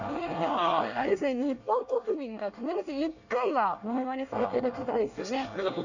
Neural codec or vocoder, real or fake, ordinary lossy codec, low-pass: codec, 24 kHz, 1 kbps, SNAC; fake; none; 7.2 kHz